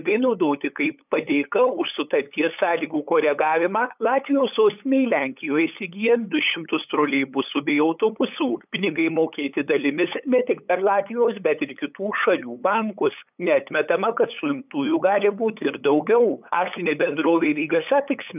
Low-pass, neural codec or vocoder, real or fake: 3.6 kHz; codec, 16 kHz, 8 kbps, FunCodec, trained on LibriTTS, 25 frames a second; fake